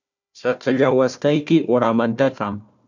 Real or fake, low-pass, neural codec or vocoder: fake; 7.2 kHz; codec, 16 kHz, 1 kbps, FunCodec, trained on Chinese and English, 50 frames a second